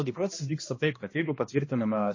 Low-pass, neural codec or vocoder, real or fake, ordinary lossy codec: 7.2 kHz; codec, 16 kHz, 1 kbps, X-Codec, HuBERT features, trained on balanced general audio; fake; MP3, 32 kbps